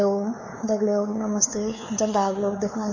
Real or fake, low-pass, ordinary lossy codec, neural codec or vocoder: fake; 7.2 kHz; MP3, 32 kbps; codec, 16 kHz, 4 kbps, X-Codec, WavLM features, trained on Multilingual LibriSpeech